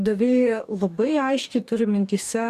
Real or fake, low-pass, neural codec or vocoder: fake; 14.4 kHz; codec, 44.1 kHz, 2.6 kbps, DAC